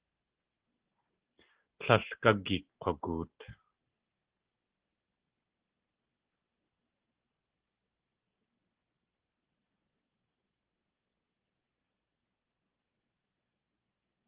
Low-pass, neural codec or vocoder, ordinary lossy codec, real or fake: 3.6 kHz; none; Opus, 16 kbps; real